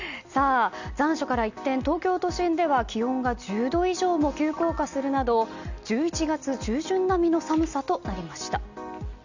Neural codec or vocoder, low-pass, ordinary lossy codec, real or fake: none; 7.2 kHz; none; real